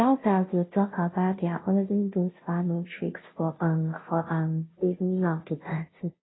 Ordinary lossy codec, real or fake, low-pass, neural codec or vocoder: AAC, 16 kbps; fake; 7.2 kHz; codec, 16 kHz, 0.5 kbps, FunCodec, trained on Chinese and English, 25 frames a second